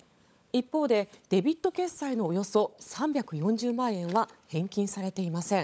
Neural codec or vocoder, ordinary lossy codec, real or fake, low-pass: codec, 16 kHz, 16 kbps, FunCodec, trained on LibriTTS, 50 frames a second; none; fake; none